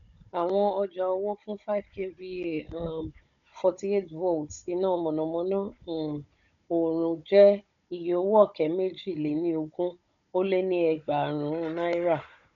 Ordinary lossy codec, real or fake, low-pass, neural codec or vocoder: none; fake; 7.2 kHz; codec, 16 kHz, 16 kbps, FunCodec, trained on Chinese and English, 50 frames a second